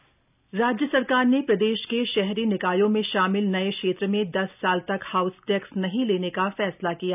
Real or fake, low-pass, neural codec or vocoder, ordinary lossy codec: real; 3.6 kHz; none; none